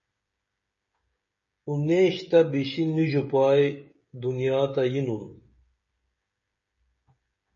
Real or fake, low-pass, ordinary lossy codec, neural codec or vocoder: fake; 7.2 kHz; MP3, 32 kbps; codec, 16 kHz, 16 kbps, FreqCodec, smaller model